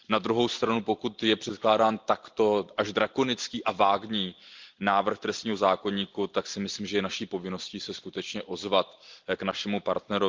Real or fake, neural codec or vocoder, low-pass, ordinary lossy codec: real; none; 7.2 kHz; Opus, 32 kbps